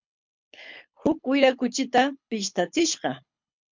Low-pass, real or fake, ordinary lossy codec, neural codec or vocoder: 7.2 kHz; fake; MP3, 64 kbps; codec, 24 kHz, 6 kbps, HILCodec